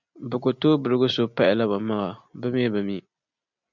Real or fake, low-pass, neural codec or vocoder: real; 7.2 kHz; none